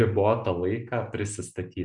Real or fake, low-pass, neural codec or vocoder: real; 10.8 kHz; none